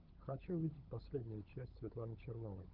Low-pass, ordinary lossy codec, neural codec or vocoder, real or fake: 5.4 kHz; Opus, 32 kbps; codec, 16 kHz, 16 kbps, FunCodec, trained on LibriTTS, 50 frames a second; fake